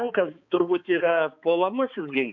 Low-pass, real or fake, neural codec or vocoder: 7.2 kHz; fake; codec, 16 kHz, 4 kbps, X-Codec, HuBERT features, trained on balanced general audio